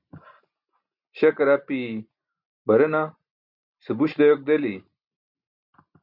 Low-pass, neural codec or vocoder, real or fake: 5.4 kHz; none; real